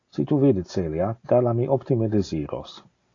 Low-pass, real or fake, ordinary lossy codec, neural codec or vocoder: 7.2 kHz; real; AAC, 32 kbps; none